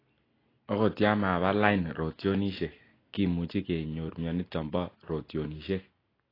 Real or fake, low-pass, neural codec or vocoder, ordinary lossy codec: real; 5.4 kHz; none; AAC, 24 kbps